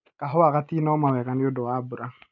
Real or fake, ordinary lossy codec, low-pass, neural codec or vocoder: real; none; 7.2 kHz; none